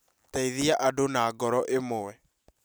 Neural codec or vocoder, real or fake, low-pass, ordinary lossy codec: none; real; none; none